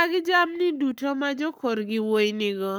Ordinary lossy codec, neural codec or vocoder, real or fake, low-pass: none; codec, 44.1 kHz, 7.8 kbps, Pupu-Codec; fake; none